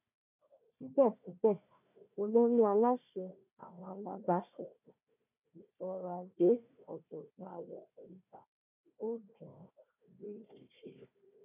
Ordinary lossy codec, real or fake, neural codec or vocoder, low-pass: none; fake; codec, 16 kHz, 1 kbps, FunCodec, trained on Chinese and English, 50 frames a second; 3.6 kHz